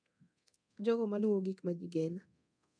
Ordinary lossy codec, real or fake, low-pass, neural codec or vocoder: none; fake; 9.9 kHz; codec, 24 kHz, 0.9 kbps, DualCodec